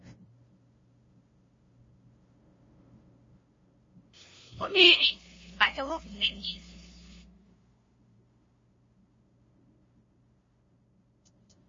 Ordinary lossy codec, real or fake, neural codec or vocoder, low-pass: MP3, 32 kbps; fake; codec, 16 kHz, 0.5 kbps, FunCodec, trained on LibriTTS, 25 frames a second; 7.2 kHz